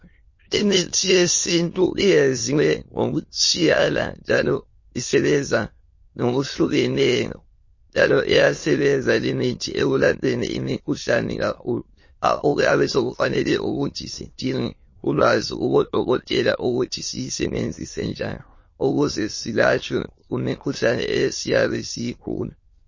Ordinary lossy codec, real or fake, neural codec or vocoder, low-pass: MP3, 32 kbps; fake; autoencoder, 22.05 kHz, a latent of 192 numbers a frame, VITS, trained on many speakers; 7.2 kHz